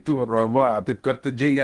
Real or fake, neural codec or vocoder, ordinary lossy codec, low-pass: fake; codec, 16 kHz in and 24 kHz out, 0.8 kbps, FocalCodec, streaming, 65536 codes; Opus, 32 kbps; 10.8 kHz